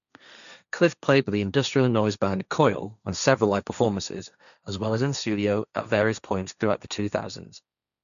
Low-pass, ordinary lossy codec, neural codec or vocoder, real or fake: 7.2 kHz; none; codec, 16 kHz, 1.1 kbps, Voila-Tokenizer; fake